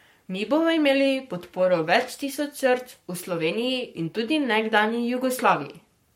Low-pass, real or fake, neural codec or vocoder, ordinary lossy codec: 19.8 kHz; fake; codec, 44.1 kHz, 7.8 kbps, Pupu-Codec; MP3, 64 kbps